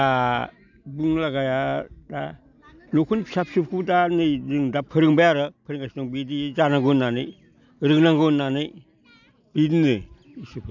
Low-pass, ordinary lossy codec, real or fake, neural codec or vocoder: 7.2 kHz; none; real; none